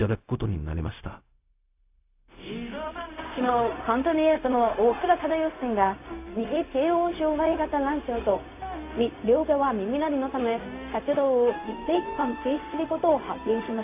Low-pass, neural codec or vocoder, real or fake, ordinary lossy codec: 3.6 kHz; codec, 16 kHz, 0.4 kbps, LongCat-Audio-Codec; fake; AAC, 24 kbps